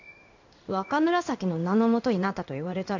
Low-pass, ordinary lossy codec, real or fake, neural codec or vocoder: 7.2 kHz; none; fake; codec, 16 kHz in and 24 kHz out, 1 kbps, XY-Tokenizer